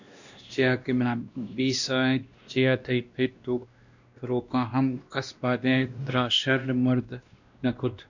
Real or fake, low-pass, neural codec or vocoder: fake; 7.2 kHz; codec, 16 kHz, 1 kbps, X-Codec, WavLM features, trained on Multilingual LibriSpeech